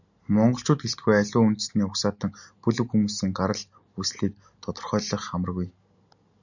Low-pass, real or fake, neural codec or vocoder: 7.2 kHz; real; none